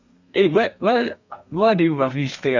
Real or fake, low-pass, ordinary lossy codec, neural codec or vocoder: fake; 7.2 kHz; none; codec, 32 kHz, 1.9 kbps, SNAC